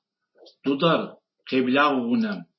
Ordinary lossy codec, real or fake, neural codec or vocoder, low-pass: MP3, 24 kbps; real; none; 7.2 kHz